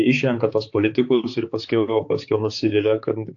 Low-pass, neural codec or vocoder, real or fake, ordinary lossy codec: 7.2 kHz; codec, 16 kHz, 4 kbps, X-Codec, HuBERT features, trained on balanced general audio; fake; AAC, 48 kbps